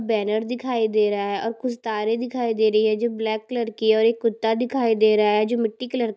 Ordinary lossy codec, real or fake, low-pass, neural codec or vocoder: none; real; none; none